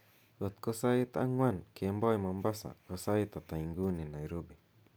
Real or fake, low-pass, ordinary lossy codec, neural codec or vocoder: real; none; none; none